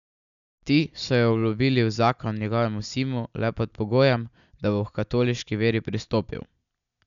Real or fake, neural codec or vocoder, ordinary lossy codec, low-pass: real; none; none; 7.2 kHz